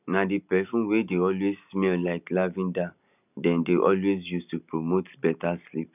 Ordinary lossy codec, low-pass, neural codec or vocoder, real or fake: none; 3.6 kHz; none; real